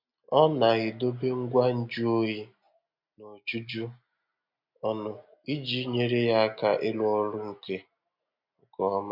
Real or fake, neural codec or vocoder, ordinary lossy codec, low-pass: real; none; MP3, 48 kbps; 5.4 kHz